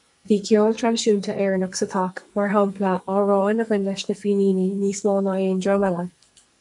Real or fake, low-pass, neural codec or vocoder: fake; 10.8 kHz; codec, 44.1 kHz, 2.6 kbps, SNAC